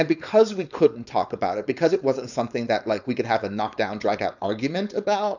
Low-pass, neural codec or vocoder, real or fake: 7.2 kHz; codec, 16 kHz, 4.8 kbps, FACodec; fake